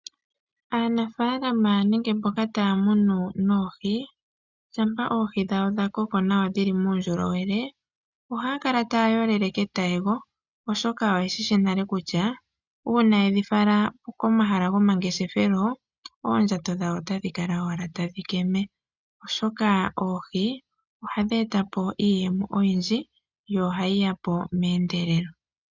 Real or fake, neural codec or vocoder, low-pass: real; none; 7.2 kHz